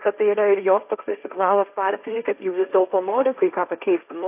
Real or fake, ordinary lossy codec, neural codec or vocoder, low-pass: fake; AAC, 32 kbps; codec, 16 kHz, 1.1 kbps, Voila-Tokenizer; 3.6 kHz